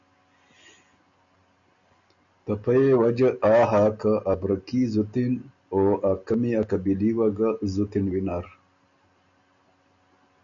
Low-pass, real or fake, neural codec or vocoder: 7.2 kHz; real; none